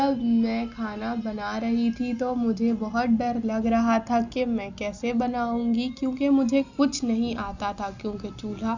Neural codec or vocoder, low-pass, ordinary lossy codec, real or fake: none; 7.2 kHz; none; real